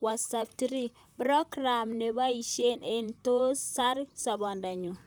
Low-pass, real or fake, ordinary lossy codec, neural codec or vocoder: none; fake; none; vocoder, 44.1 kHz, 128 mel bands, Pupu-Vocoder